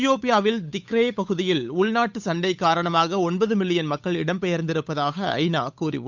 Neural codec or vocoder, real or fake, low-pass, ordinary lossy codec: codec, 16 kHz, 8 kbps, FunCodec, trained on Chinese and English, 25 frames a second; fake; 7.2 kHz; none